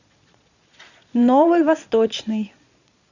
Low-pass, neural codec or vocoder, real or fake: 7.2 kHz; vocoder, 22.05 kHz, 80 mel bands, Vocos; fake